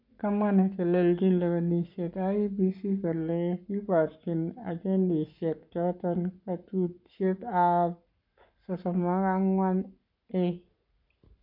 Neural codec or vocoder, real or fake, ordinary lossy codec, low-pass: codec, 44.1 kHz, 7.8 kbps, Pupu-Codec; fake; none; 5.4 kHz